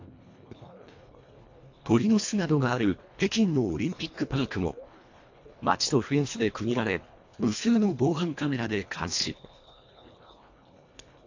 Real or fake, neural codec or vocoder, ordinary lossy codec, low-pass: fake; codec, 24 kHz, 1.5 kbps, HILCodec; AAC, 48 kbps; 7.2 kHz